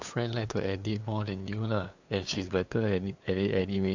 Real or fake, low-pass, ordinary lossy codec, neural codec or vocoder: fake; 7.2 kHz; AAC, 48 kbps; codec, 16 kHz, 8 kbps, FunCodec, trained on LibriTTS, 25 frames a second